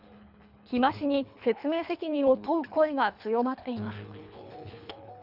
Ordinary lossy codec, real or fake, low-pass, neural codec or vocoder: none; fake; 5.4 kHz; codec, 24 kHz, 3 kbps, HILCodec